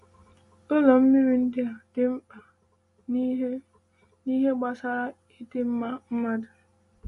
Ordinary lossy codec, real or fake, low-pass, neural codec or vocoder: MP3, 48 kbps; real; 14.4 kHz; none